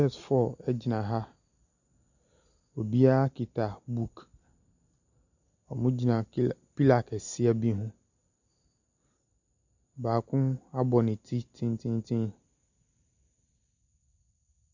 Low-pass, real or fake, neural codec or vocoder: 7.2 kHz; real; none